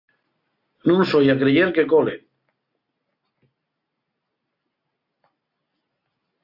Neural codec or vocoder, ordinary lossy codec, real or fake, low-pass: none; AAC, 48 kbps; real; 5.4 kHz